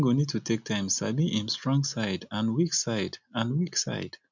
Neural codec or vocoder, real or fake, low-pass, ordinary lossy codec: none; real; 7.2 kHz; none